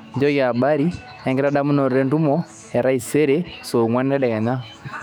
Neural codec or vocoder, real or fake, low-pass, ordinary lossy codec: autoencoder, 48 kHz, 128 numbers a frame, DAC-VAE, trained on Japanese speech; fake; 19.8 kHz; none